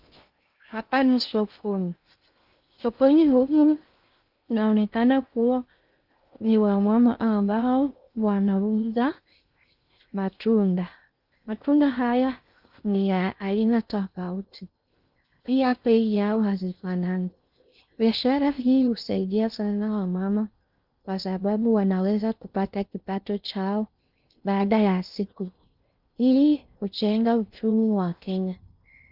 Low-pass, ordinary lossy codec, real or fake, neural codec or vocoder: 5.4 kHz; Opus, 24 kbps; fake; codec, 16 kHz in and 24 kHz out, 0.6 kbps, FocalCodec, streaming, 2048 codes